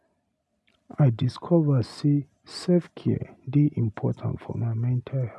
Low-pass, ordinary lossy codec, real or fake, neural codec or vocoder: none; none; real; none